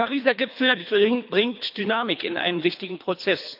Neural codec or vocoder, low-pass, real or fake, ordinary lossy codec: codec, 24 kHz, 3 kbps, HILCodec; 5.4 kHz; fake; none